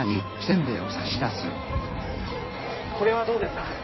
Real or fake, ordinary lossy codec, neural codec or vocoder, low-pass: fake; MP3, 24 kbps; codec, 16 kHz in and 24 kHz out, 1.1 kbps, FireRedTTS-2 codec; 7.2 kHz